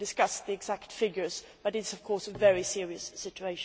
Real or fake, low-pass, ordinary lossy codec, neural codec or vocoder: real; none; none; none